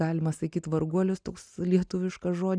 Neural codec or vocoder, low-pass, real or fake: none; 9.9 kHz; real